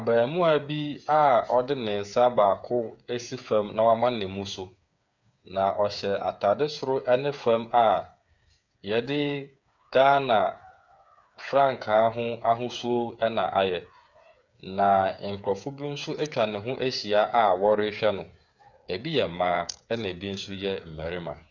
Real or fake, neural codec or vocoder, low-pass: fake; codec, 16 kHz, 8 kbps, FreqCodec, smaller model; 7.2 kHz